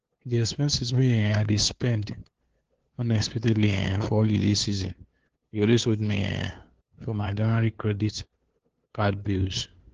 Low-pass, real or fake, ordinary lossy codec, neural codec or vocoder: 7.2 kHz; fake; Opus, 16 kbps; codec, 16 kHz, 2 kbps, FunCodec, trained on LibriTTS, 25 frames a second